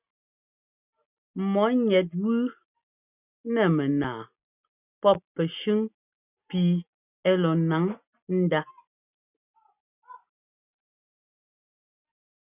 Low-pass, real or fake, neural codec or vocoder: 3.6 kHz; real; none